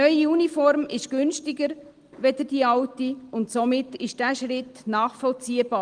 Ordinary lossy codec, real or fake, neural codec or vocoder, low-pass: Opus, 24 kbps; real; none; 9.9 kHz